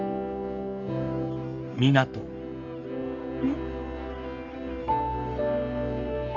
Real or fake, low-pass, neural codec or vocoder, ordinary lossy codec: fake; 7.2 kHz; codec, 44.1 kHz, 3.4 kbps, Pupu-Codec; none